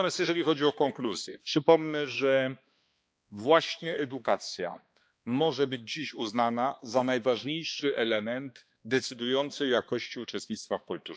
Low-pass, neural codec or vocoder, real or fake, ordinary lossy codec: none; codec, 16 kHz, 2 kbps, X-Codec, HuBERT features, trained on balanced general audio; fake; none